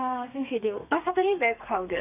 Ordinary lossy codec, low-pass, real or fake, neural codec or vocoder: none; 3.6 kHz; fake; codec, 32 kHz, 1.9 kbps, SNAC